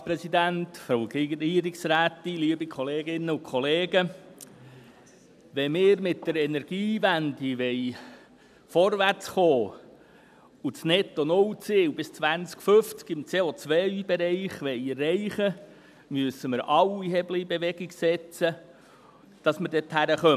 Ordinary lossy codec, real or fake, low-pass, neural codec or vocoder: none; real; 14.4 kHz; none